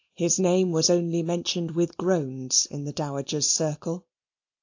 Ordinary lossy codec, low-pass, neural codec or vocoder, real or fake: AAC, 48 kbps; 7.2 kHz; none; real